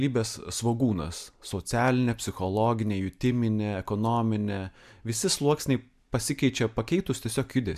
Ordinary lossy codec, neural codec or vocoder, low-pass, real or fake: MP3, 96 kbps; none; 14.4 kHz; real